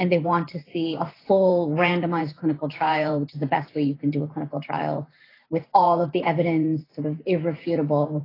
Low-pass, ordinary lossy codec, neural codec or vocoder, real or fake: 5.4 kHz; AAC, 24 kbps; vocoder, 44.1 kHz, 128 mel bands every 512 samples, BigVGAN v2; fake